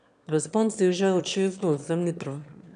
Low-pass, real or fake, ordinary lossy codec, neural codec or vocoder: 9.9 kHz; fake; none; autoencoder, 22.05 kHz, a latent of 192 numbers a frame, VITS, trained on one speaker